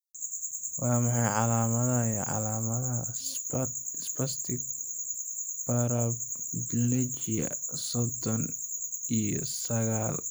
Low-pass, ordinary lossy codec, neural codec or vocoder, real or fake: none; none; vocoder, 44.1 kHz, 128 mel bands every 256 samples, BigVGAN v2; fake